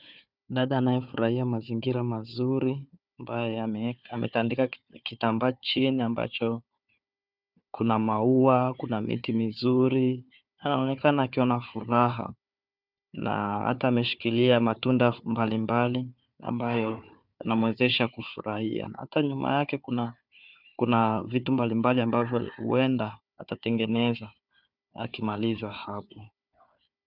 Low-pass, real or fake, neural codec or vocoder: 5.4 kHz; fake; codec, 16 kHz, 4 kbps, FunCodec, trained on Chinese and English, 50 frames a second